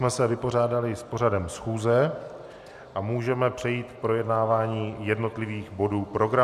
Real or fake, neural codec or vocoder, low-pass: real; none; 14.4 kHz